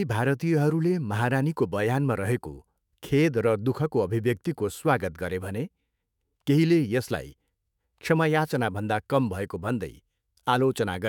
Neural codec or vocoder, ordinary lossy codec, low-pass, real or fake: autoencoder, 48 kHz, 128 numbers a frame, DAC-VAE, trained on Japanese speech; none; 19.8 kHz; fake